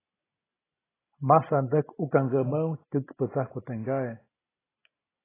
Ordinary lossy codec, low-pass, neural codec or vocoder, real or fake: AAC, 16 kbps; 3.6 kHz; vocoder, 44.1 kHz, 128 mel bands every 256 samples, BigVGAN v2; fake